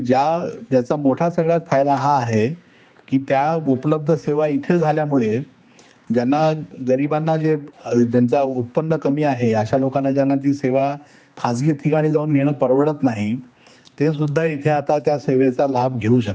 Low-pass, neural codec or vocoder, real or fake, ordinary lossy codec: none; codec, 16 kHz, 2 kbps, X-Codec, HuBERT features, trained on general audio; fake; none